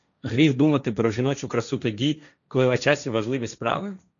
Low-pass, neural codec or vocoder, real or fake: 7.2 kHz; codec, 16 kHz, 1.1 kbps, Voila-Tokenizer; fake